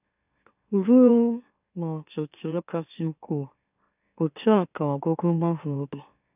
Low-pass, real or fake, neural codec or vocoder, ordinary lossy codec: 3.6 kHz; fake; autoencoder, 44.1 kHz, a latent of 192 numbers a frame, MeloTTS; none